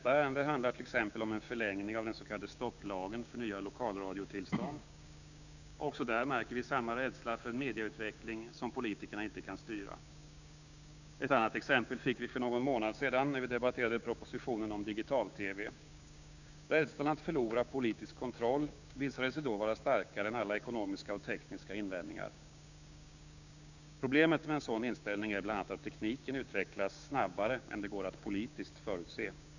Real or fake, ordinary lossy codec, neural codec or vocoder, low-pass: fake; none; codec, 16 kHz, 6 kbps, DAC; 7.2 kHz